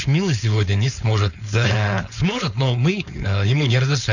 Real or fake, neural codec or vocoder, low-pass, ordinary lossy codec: fake; codec, 16 kHz, 4.8 kbps, FACodec; 7.2 kHz; none